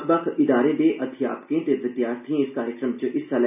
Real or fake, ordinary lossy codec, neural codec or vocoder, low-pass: real; none; none; 3.6 kHz